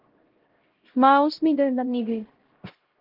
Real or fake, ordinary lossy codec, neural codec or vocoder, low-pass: fake; Opus, 16 kbps; codec, 16 kHz, 0.5 kbps, X-Codec, HuBERT features, trained on LibriSpeech; 5.4 kHz